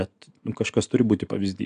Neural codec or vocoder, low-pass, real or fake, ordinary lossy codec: none; 9.9 kHz; real; MP3, 96 kbps